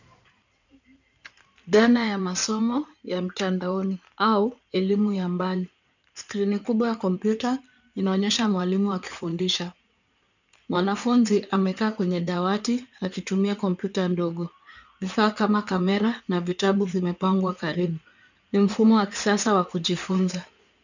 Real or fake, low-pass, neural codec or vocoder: fake; 7.2 kHz; codec, 16 kHz in and 24 kHz out, 2.2 kbps, FireRedTTS-2 codec